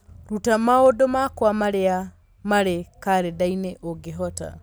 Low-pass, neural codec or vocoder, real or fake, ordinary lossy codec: none; none; real; none